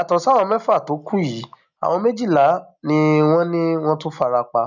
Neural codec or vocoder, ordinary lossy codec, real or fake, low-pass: none; none; real; 7.2 kHz